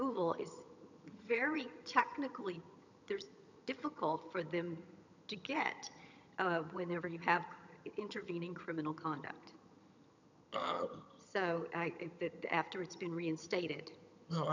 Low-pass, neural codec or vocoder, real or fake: 7.2 kHz; vocoder, 22.05 kHz, 80 mel bands, HiFi-GAN; fake